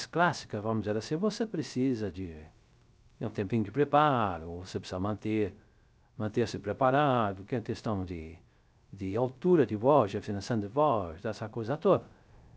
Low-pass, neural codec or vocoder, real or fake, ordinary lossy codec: none; codec, 16 kHz, 0.3 kbps, FocalCodec; fake; none